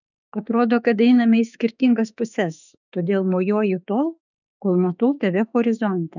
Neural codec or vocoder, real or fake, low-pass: autoencoder, 48 kHz, 32 numbers a frame, DAC-VAE, trained on Japanese speech; fake; 7.2 kHz